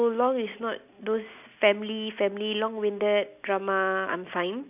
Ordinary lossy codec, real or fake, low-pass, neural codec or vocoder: none; real; 3.6 kHz; none